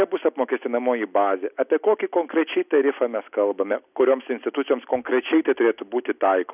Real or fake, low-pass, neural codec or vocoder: real; 3.6 kHz; none